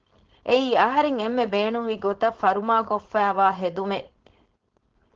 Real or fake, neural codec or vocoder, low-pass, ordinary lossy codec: fake; codec, 16 kHz, 4.8 kbps, FACodec; 7.2 kHz; Opus, 16 kbps